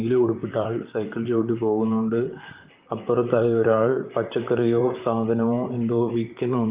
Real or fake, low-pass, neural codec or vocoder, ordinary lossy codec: fake; 3.6 kHz; vocoder, 44.1 kHz, 128 mel bands, Pupu-Vocoder; Opus, 32 kbps